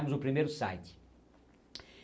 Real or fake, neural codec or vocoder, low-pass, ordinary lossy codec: real; none; none; none